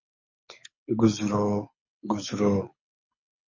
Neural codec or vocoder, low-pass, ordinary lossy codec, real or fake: codec, 24 kHz, 6 kbps, HILCodec; 7.2 kHz; MP3, 32 kbps; fake